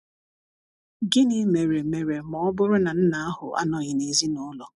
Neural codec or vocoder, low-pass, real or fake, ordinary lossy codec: none; 10.8 kHz; real; none